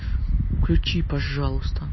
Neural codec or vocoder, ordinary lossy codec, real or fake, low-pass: none; MP3, 24 kbps; real; 7.2 kHz